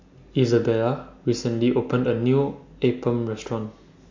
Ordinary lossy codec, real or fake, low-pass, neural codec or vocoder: MP3, 48 kbps; real; 7.2 kHz; none